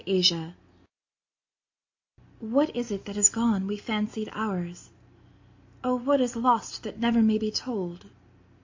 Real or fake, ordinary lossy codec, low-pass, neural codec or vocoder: real; AAC, 48 kbps; 7.2 kHz; none